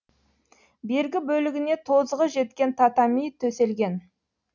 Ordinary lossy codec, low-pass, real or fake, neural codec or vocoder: none; 7.2 kHz; real; none